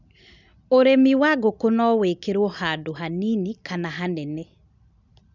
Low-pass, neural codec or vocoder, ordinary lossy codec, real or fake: 7.2 kHz; none; none; real